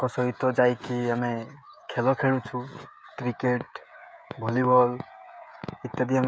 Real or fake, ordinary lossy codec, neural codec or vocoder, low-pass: fake; none; codec, 16 kHz, 16 kbps, FreqCodec, smaller model; none